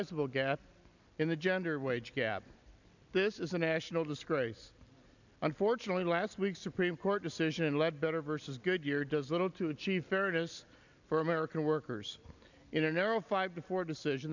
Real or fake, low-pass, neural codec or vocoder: real; 7.2 kHz; none